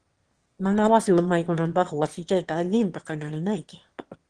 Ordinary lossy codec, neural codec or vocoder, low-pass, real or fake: Opus, 16 kbps; autoencoder, 22.05 kHz, a latent of 192 numbers a frame, VITS, trained on one speaker; 9.9 kHz; fake